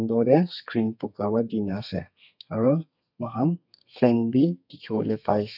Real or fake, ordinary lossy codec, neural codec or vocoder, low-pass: fake; none; codec, 32 kHz, 1.9 kbps, SNAC; 5.4 kHz